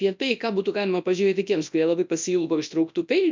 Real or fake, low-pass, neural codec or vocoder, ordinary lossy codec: fake; 7.2 kHz; codec, 24 kHz, 0.9 kbps, WavTokenizer, large speech release; MP3, 64 kbps